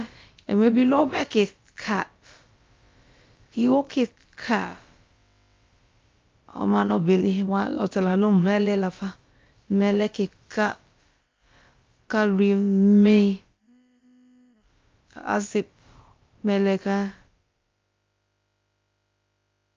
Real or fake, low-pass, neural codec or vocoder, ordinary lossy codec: fake; 7.2 kHz; codec, 16 kHz, about 1 kbps, DyCAST, with the encoder's durations; Opus, 24 kbps